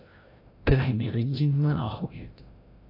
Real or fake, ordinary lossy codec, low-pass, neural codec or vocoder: fake; AAC, 32 kbps; 5.4 kHz; codec, 16 kHz, 0.5 kbps, FreqCodec, larger model